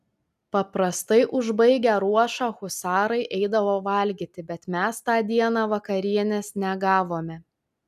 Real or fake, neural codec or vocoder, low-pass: real; none; 14.4 kHz